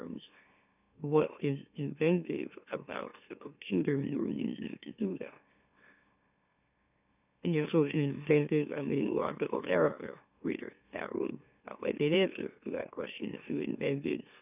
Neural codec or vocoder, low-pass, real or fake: autoencoder, 44.1 kHz, a latent of 192 numbers a frame, MeloTTS; 3.6 kHz; fake